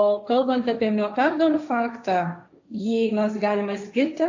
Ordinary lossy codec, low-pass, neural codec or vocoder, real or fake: AAC, 48 kbps; 7.2 kHz; codec, 16 kHz, 1.1 kbps, Voila-Tokenizer; fake